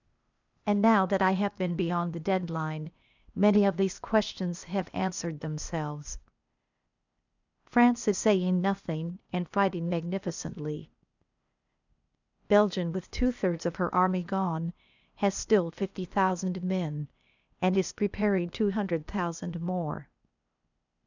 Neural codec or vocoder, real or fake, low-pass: codec, 16 kHz, 0.8 kbps, ZipCodec; fake; 7.2 kHz